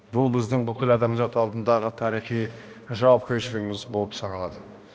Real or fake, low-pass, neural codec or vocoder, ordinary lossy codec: fake; none; codec, 16 kHz, 1 kbps, X-Codec, HuBERT features, trained on balanced general audio; none